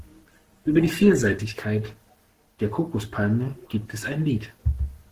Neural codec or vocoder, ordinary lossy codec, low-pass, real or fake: codec, 44.1 kHz, 7.8 kbps, Pupu-Codec; Opus, 16 kbps; 14.4 kHz; fake